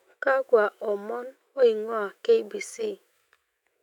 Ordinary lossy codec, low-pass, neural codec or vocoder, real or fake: none; 19.8 kHz; autoencoder, 48 kHz, 128 numbers a frame, DAC-VAE, trained on Japanese speech; fake